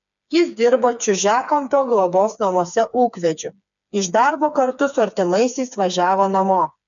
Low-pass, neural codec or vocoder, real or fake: 7.2 kHz; codec, 16 kHz, 4 kbps, FreqCodec, smaller model; fake